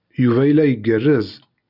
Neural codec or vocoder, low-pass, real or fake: none; 5.4 kHz; real